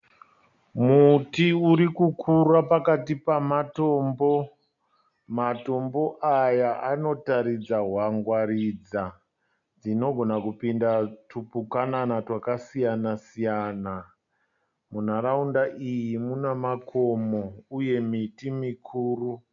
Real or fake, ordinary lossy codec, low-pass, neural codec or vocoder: real; MP3, 64 kbps; 7.2 kHz; none